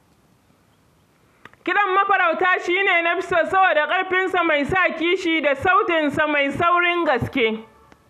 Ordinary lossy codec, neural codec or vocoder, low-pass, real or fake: none; none; 14.4 kHz; real